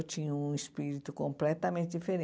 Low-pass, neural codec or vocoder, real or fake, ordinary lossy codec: none; none; real; none